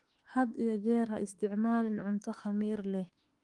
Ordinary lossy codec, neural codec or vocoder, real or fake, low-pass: Opus, 24 kbps; autoencoder, 48 kHz, 32 numbers a frame, DAC-VAE, trained on Japanese speech; fake; 10.8 kHz